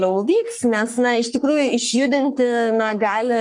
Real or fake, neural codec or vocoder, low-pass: fake; codec, 44.1 kHz, 3.4 kbps, Pupu-Codec; 10.8 kHz